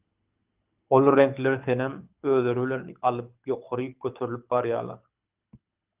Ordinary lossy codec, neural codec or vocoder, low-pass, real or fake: Opus, 24 kbps; codec, 24 kHz, 6 kbps, HILCodec; 3.6 kHz; fake